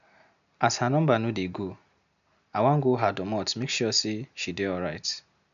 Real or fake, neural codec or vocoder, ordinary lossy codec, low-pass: real; none; none; 7.2 kHz